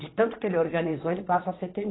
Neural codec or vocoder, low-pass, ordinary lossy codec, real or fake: vocoder, 22.05 kHz, 80 mel bands, Vocos; 7.2 kHz; AAC, 16 kbps; fake